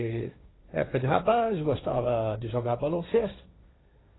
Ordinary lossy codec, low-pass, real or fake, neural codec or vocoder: AAC, 16 kbps; 7.2 kHz; fake; codec, 16 kHz, 1.1 kbps, Voila-Tokenizer